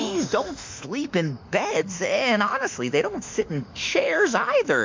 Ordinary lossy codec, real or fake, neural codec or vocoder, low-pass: MP3, 64 kbps; fake; autoencoder, 48 kHz, 32 numbers a frame, DAC-VAE, trained on Japanese speech; 7.2 kHz